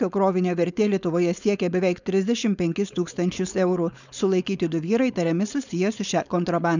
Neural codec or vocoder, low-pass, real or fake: codec, 16 kHz, 4.8 kbps, FACodec; 7.2 kHz; fake